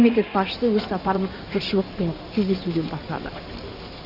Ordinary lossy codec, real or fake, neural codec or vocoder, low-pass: Opus, 64 kbps; fake; codec, 16 kHz in and 24 kHz out, 2.2 kbps, FireRedTTS-2 codec; 5.4 kHz